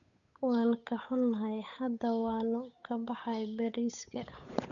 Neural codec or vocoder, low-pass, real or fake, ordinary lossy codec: codec, 16 kHz, 8 kbps, FunCodec, trained on Chinese and English, 25 frames a second; 7.2 kHz; fake; none